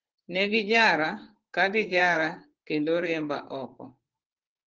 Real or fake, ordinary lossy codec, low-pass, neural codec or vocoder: fake; Opus, 16 kbps; 7.2 kHz; vocoder, 44.1 kHz, 128 mel bands, Pupu-Vocoder